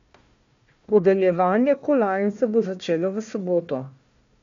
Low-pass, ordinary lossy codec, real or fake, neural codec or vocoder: 7.2 kHz; MP3, 64 kbps; fake; codec, 16 kHz, 1 kbps, FunCodec, trained on Chinese and English, 50 frames a second